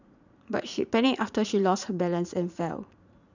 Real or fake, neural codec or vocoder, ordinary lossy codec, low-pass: fake; vocoder, 44.1 kHz, 80 mel bands, Vocos; none; 7.2 kHz